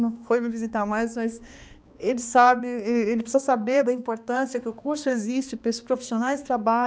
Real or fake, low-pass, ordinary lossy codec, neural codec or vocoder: fake; none; none; codec, 16 kHz, 2 kbps, X-Codec, HuBERT features, trained on balanced general audio